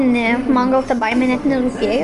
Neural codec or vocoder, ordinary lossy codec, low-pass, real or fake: none; Opus, 32 kbps; 10.8 kHz; real